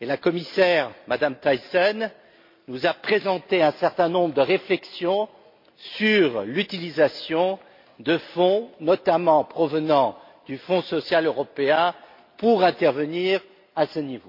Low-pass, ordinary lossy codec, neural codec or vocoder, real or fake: 5.4 kHz; MP3, 32 kbps; none; real